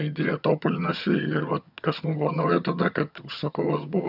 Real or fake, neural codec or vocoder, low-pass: fake; vocoder, 22.05 kHz, 80 mel bands, HiFi-GAN; 5.4 kHz